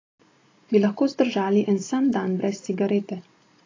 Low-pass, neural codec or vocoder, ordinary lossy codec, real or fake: 7.2 kHz; none; AAC, 32 kbps; real